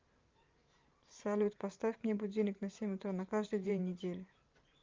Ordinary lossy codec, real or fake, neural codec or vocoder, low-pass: Opus, 24 kbps; fake; vocoder, 44.1 kHz, 128 mel bands every 512 samples, BigVGAN v2; 7.2 kHz